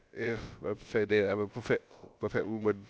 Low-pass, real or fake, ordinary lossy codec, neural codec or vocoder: none; fake; none; codec, 16 kHz, about 1 kbps, DyCAST, with the encoder's durations